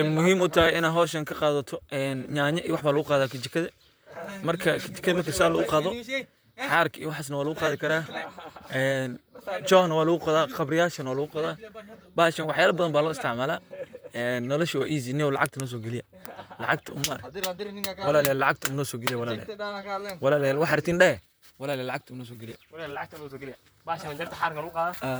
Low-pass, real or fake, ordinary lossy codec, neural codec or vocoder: none; fake; none; vocoder, 44.1 kHz, 128 mel bands, Pupu-Vocoder